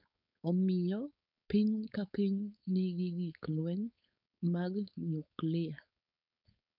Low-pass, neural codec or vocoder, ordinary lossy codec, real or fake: 5.4 kHz; codec, 16 kHz, 4.8 kbps, FACodec; none; fake